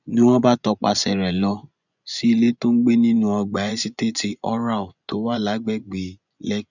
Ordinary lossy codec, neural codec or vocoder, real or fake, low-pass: none; vocoder, 24 kHz, 100 mel bands, Vocos; fake; 7.2 kHz